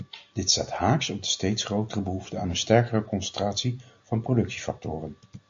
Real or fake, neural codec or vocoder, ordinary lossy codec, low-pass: real; none; MP3, 48 kbps; 7.2 kHz